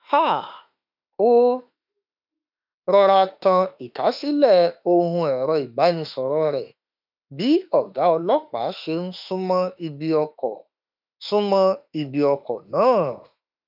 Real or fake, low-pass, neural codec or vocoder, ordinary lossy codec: fake; 5.4 kHz; autoencoder, 48 kHz, 32 numbers a frame, DAC-VAE, trained on Japanese speech; none